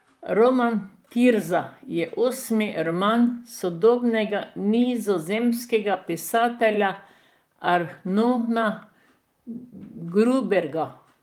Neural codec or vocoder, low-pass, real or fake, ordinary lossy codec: vocoder, 44.1 kHz, 128 mel bands, Pupu-Vocoder; 19.8 kHz; fake; Opus, 32 kbps